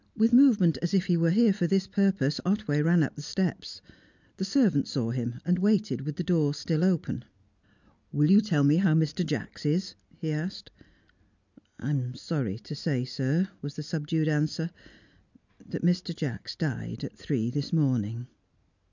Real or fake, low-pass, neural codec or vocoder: real; 7.2 kHz; none